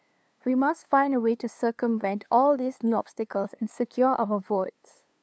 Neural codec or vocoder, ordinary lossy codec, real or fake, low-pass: codec, 16 kHz, 2 kbps, FunCodec, trained on LibriTTS, 25 frames a second; none; fake; none